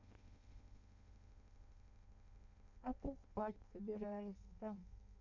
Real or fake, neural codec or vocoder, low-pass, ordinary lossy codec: fake; codec, 16 kHz in and 24 kHz out, 0.6 kbps, FireRedTTS-2 codec; 7.2 kHz; none